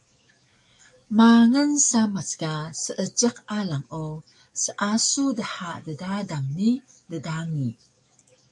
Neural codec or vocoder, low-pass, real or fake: codec, 44.1 kHz, 7.8 kbps, DAC; 10.8 kHz; fake